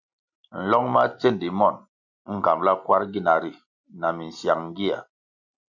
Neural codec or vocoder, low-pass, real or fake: none; 7.2 kHz; real